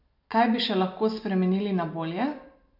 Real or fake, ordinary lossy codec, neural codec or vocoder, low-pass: real; none; none; 5.4 kHz